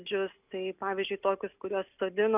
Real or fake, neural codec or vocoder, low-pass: real; none; 3.6 kHz